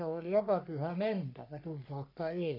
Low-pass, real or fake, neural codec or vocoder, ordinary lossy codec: 5.4 kHz; fake; codec, 44.1 kHz, 3.4 kbps, Pupu-Codec; none